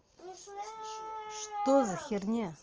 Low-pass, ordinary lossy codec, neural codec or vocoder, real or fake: 7.2 kHz; Opus, 24 kbps; none; real